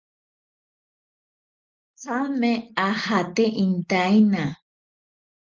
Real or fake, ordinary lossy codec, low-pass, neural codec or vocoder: real; Opus, 32 kbps; 7.2 kHz; none